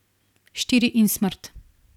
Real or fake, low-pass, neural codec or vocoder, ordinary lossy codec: real; 19.8 kHz; none; none